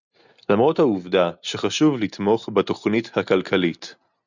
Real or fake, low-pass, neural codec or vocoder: real; 7.2 kHz; none